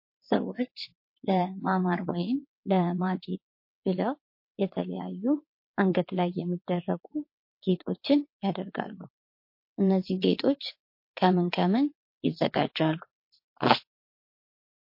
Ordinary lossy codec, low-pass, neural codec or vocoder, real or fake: MP3, 32 kbps; 5.4 kHz; vocoder, 22.05 kHz, 80 mel bands, WaveNeXt; fake